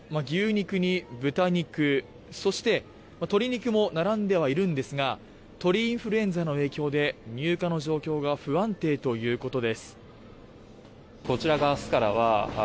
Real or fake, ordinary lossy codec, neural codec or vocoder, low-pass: real; none; none; none